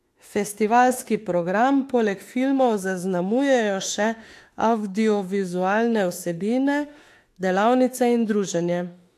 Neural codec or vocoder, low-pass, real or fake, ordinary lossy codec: autoencoder, 48 kHz, 32 numbers a frame, DAC-VAE, trained on Japanese speech; 14.4 kHz; fake; AAC, 64 kbps